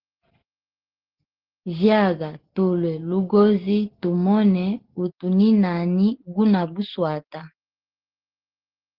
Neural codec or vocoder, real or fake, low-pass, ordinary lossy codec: none; real; 5.4 kHz; Opus, 16 kbps